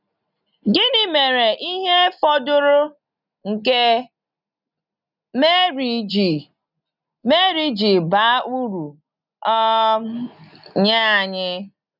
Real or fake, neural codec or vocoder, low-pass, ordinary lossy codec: real; none; 5.4 kHz; none